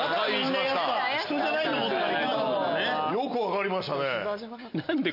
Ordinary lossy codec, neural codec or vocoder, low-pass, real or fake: none; none; 5.4 kHz; real